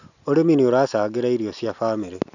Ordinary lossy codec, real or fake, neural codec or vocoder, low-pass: none; real; none; 7.2 kHz